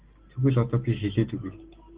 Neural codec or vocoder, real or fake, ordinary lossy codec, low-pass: none; real; Opus, 16 kbps; 3.6 kHz